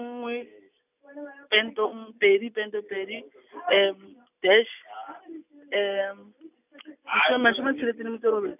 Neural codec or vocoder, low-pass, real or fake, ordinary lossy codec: none; 3.6 kHz; real; none